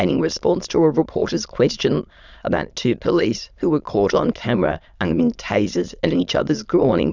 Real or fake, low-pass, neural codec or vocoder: fake; 7.2 kHz; autoencoder, 22.05 kHz, a latent of 192 numbers a frame, VITS, trained on many speakers